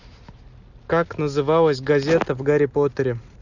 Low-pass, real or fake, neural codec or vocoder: 7.2 kHz; real; none